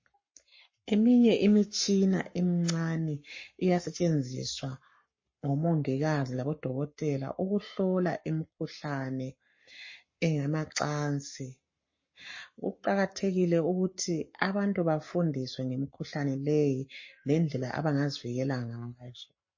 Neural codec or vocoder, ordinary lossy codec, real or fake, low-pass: codec, 44.1 kHz, 7.8 kbps, Pupu-Codec; MP3, 32 kbps; fake; 7.2 kHz